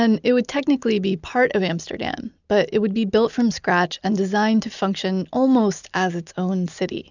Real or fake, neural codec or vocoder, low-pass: real; none; 7.2 kHz